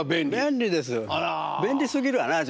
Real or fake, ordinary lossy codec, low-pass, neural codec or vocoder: real; none; none; none